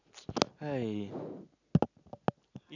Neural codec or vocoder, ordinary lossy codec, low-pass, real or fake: none; none; 7.2 kHz; real